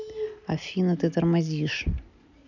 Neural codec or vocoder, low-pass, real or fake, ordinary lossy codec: none; 7.2 kHz; real; none